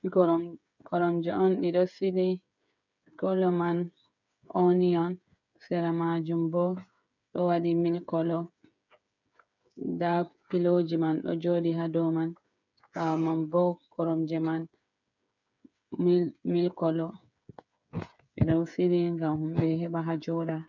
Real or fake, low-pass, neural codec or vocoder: fake; 7.2 kHz; codec, 16 kHz, 8 kbps, FreqCodec, smaller model